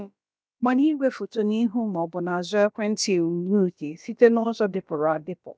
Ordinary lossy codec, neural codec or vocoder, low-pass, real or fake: none; codec, 16 kHz, about 1 kbps, DyCAST, with the encoder's durations; none; fake